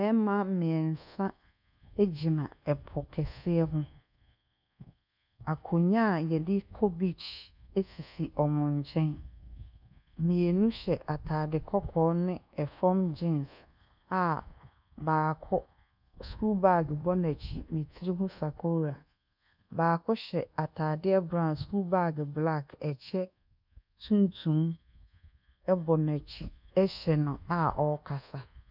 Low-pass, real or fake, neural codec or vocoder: 5.4 kHz; fake; codec, 24 kHz, 1.2 kbps, DualCodec